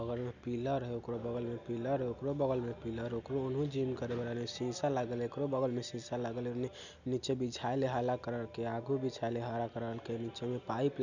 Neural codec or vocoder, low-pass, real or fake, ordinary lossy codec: none; 7.2 kHz; real; none